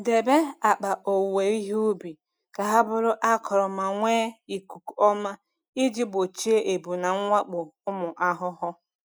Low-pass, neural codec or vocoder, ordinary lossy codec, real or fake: none; none; none; real